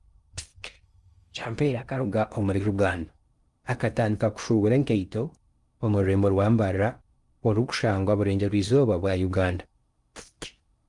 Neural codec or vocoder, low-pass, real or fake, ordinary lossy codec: codec, 16 kHz in and 24 kHz out, 0.6 kbps, FocalCodec, streaming, 4096 codes; 10.8 kHz; fake; Opus, 32 kbps